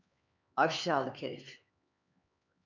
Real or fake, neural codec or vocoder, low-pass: fake; codec, 16 kHz, 4 kbps, X-Codec, HuBERT features, trained on LibriSpeech; 7.2 kHz